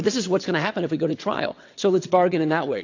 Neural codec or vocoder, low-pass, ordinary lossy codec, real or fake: vocoder, 22.05 kHz, 80 mel bands, WaveNeXt; 7.2 kHz; AAC, 48 kbps; fake